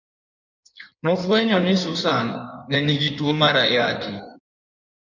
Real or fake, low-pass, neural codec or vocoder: fake; 7.2 kHz; codec, 16 kHz in and 24 kHz out, 1.1 kbps, FireRedTTS-2 codec